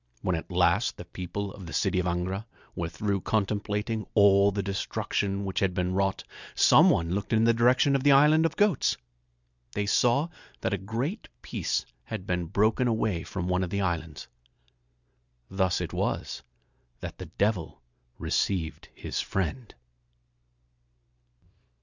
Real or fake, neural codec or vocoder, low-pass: real; none; 7.2 kHz